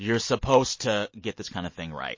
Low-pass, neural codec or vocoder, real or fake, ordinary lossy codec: 7.2 kHz; none; real; MP3, 32 kbps